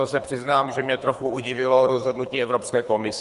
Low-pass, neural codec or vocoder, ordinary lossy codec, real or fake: 10.8 kHz; codec, 24 kHz, 3 kbps, HILCodec; MP3, 64 kbps; fake